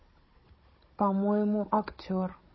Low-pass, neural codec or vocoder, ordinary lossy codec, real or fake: 7.2 kHz; codec, 16 kHz, 16 kbps, FunCodec, trained on Chinese and English, 50 frames a second; MP3, 24 kbps; fake